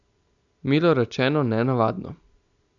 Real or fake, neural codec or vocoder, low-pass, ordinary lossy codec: real; none; 7.2 kHz; none